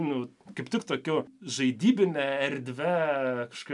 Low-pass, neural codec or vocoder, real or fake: 10.8 kHz; none; real